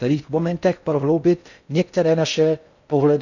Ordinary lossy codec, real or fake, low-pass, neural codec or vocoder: none; fake; 7.2 kHz; codec, 16 kHz in and 24 kHz out, 0.6 kbps, FocalCodec, streaming, 4096 codes